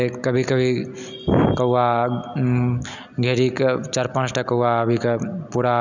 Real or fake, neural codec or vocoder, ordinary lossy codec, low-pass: real; none; none; 7.2 kHz